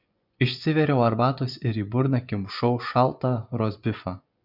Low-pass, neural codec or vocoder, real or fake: 5.4 kHz; vocoder, 24 kHz, 100 mel bands, Vocos; fake